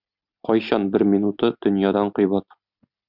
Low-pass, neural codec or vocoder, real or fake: 5.4 kHz; none; real